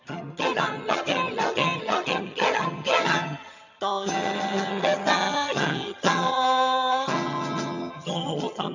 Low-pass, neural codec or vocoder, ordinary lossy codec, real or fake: 7.2 kHz; vocoder, 22.05 kHz, 80 mel bands, HiFi-GAN; none; fake